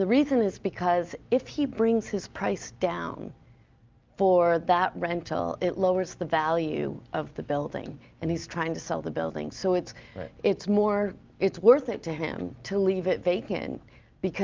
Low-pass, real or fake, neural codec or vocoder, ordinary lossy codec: 7.2 kHz; real; none; Opus, 24 kbps